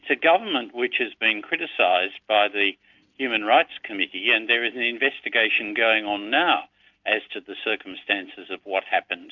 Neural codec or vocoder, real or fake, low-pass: none; real; 7.2 kHz